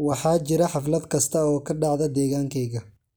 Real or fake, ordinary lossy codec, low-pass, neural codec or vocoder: real; none; none; none